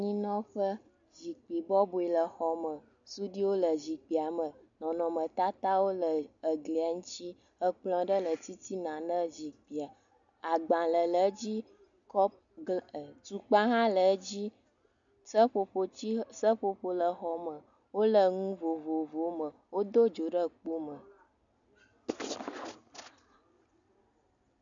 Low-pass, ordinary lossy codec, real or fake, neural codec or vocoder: 7.2 kHz; MP3, 96 kbps; real; none